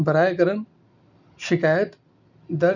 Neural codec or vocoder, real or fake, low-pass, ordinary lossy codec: none; real; 7.2 kHz; none